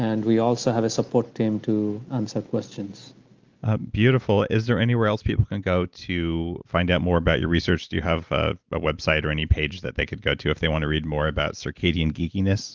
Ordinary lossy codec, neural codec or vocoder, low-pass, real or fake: Opus, 32 kbps; none; 7.2 kHz; real